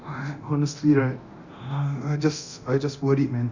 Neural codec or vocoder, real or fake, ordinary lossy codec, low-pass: codec, 24 kHz, 0.9 kbps, DualCodec; fake; Opus, 64 kbps; 7.2 kHz